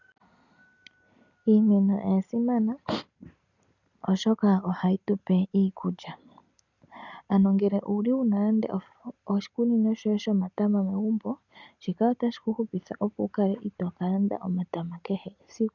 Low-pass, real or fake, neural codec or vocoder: 7.2 kHz; real; none